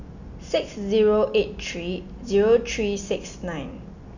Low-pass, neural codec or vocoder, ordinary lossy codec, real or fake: 7.2 kHz; none; none; real